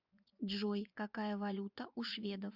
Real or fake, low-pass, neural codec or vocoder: fake; 5.4 kHz; vocoder, 24 kHz, 100 mel bands, Vocos